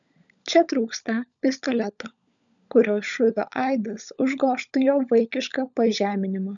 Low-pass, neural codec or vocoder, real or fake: 7.2 kHz; codec, 16 kHz, 16 kbps, FunCodec, trained on LibriTTS, 50 frames a second; fake